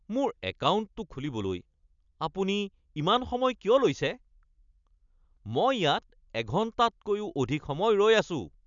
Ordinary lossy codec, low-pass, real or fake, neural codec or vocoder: none; 7.2 kHz; real; none